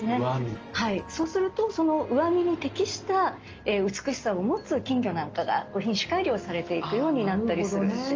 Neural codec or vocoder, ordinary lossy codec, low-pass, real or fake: none; Opus, 24 kbps; 7.2 kHz; real